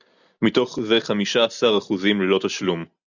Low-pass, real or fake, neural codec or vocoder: 7.2 kHz; real; none